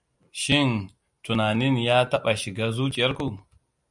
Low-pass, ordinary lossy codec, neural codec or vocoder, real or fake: 10.8 kHz; MP3, 96 kbps; none; real